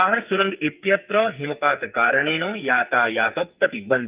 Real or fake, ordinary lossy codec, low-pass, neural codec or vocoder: fake; Opus, 24 kbps; 3.6 kHz; codec, 44.1 kHz, 2.6 kbps, SNAC